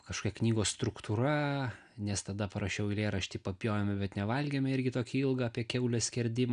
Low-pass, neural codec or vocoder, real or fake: 9.9 kHz; none; real